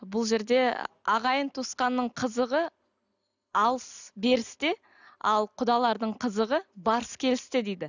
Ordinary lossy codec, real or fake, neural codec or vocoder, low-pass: none; real; none; 7.2 kHz